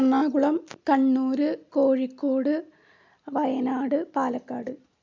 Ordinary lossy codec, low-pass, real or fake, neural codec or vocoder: MP3, 64 kbps; 7.2 kHz; real; none